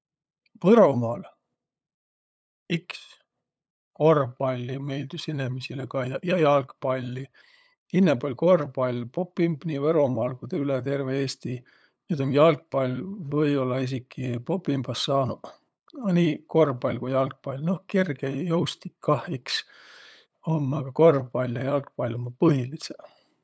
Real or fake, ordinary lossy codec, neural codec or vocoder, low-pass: fake; none; codec, 16 kHz, 8 kbps, FunCodec, trained on LibriTTS, 25 frames a second; none